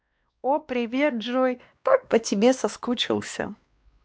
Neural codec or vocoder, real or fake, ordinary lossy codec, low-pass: codec, 16 kHz, 2 kbps, X-Codec, WavLM features, trained on Multilingual LibriSpeech; fake; none; none